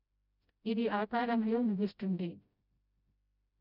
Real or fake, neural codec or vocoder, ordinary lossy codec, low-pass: fake; codec, 16 kHz, 0.5 kbps, FreqCodec, smaller model; none; 5.4 kHz